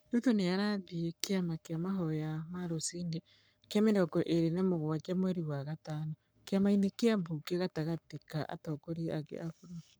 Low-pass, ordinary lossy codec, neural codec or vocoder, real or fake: none; none; codec, 44.1 kHz, 7.8 kbps, Pupu-Codec; fake